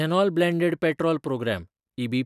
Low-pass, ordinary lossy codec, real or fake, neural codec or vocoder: 14.4 kHz; none; real; none